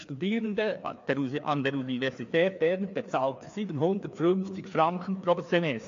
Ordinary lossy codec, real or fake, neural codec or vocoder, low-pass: AAC, 48 kbps; fake; codec, 16 kHz, 2 kbps, FreqCodec, larger model; 7.2 kHz